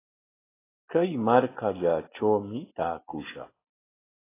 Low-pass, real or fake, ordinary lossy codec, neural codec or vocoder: 3.6 kHz; real; AAC, 16 kbps; none